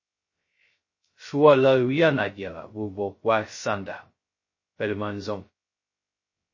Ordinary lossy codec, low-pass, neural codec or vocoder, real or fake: MP3, 32 kbps; 7.2 kHz; codec, 16 kHz, 0.2 kbps, FocalCodec; fake